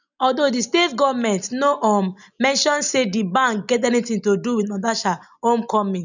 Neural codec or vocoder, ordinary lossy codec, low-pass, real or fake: none; none; 7.2 kHz; real